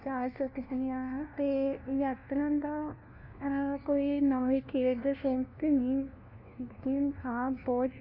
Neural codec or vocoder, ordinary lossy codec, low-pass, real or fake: codec, 16 kHz, 1 kbps, FunCodec, trained on LibriTTS, 50 frames a second; none; 5.4 kHz; fake